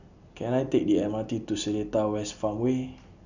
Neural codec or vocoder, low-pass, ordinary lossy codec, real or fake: none; 7.2 kHz; none; real